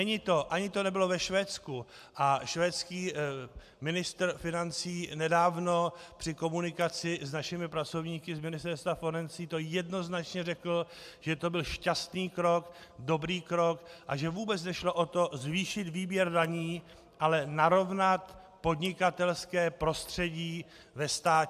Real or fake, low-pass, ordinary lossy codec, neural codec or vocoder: real; 14.4 kHz; AAC, 96 kbps; none